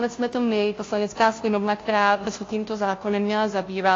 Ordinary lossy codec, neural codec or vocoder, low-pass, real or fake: AAC, 32 kbps; codec, 16 kHz, 0.5 kbps, FunCodec, trained on Chinese and English, 25 frames a second; 7.2 kHz; fake